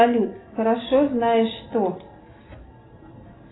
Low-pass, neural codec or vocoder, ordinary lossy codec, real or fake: 7.2 kHz; none; AAC, 16 kbps; real